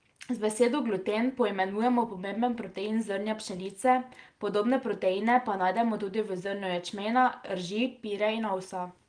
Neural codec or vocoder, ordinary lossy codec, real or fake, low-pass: none; Opus, 24 kbps; real; 9.9 kHz